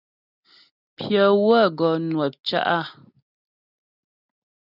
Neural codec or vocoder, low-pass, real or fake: none; 5.4 kHz; real